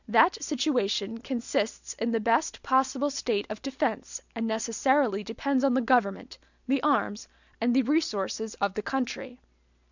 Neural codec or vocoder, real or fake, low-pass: none; real; 7.2 kHz